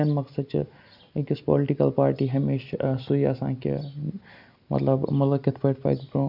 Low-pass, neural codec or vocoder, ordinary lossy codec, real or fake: 5.4 kHz; none; none; real